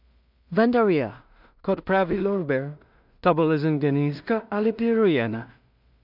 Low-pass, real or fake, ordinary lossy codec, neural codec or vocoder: 5.4 kHz; fake; none; codec, 16 kHz in and 24 kHz out, 0.4 kbps, LongCat-Audio-Codec, two codebook decoder